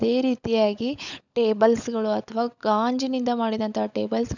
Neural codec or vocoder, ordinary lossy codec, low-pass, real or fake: codec, 16 kHz, 16 kbps, FunCodec, trained on LibriTTS, 50 frames a second; none; 7.2 kHz; fake